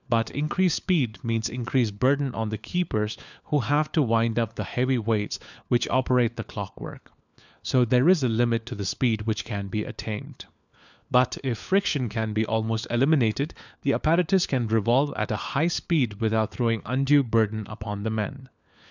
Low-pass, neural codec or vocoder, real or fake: 7.2 kHz; codec, 16 kHz, 4 kbps, FunCodec, trained on LibriTTS, 50 frames a second; fake